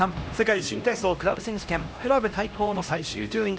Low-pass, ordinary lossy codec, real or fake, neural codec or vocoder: none; none; fake; codec, 16 kHz, 1 kbps, X-Codec, HuBERT features, trained on LibriSpeech